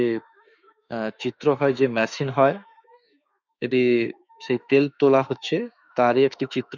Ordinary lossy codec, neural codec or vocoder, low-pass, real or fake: none; autoencoder, 48 kHz, 32 numbers a frame, DAC-VAE, trained on Japanese speech; 7.2 kHz; fake